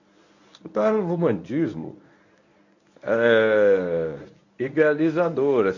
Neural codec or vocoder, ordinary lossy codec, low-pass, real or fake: codec, 24 kHz, 0.9 kbps, WavTokenizer, medium speech release version 1; none; 7.2 kHz; fake